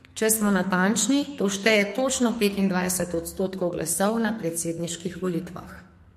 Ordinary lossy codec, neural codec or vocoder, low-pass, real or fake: MP3, 64 kbps; codec, 44.1 kHz, 2.6 kbps, SNAC; 14.4 kHz; fake